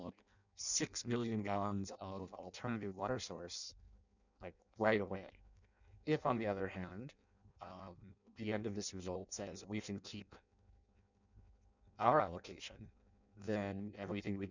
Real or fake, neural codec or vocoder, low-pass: fake; codec, 16 kHz in and 24 kHz out, 0.6 kbps, FireRedTTS-2 codec; 7.2 kHz